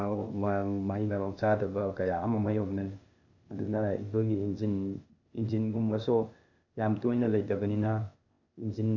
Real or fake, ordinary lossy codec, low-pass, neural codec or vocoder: fake; none; 7.2 kHz; codec, 16 kHz, 0.8 kbps, ZipCodec